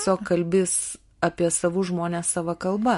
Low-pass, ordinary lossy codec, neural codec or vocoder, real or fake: 14.4 kHz; MP3, 48 kbps; none; real